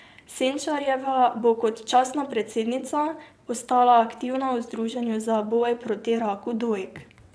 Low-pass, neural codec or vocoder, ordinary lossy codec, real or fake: none; vocoder, 22.05 kHz, 80 mel bands, WaveNeXt; none; fake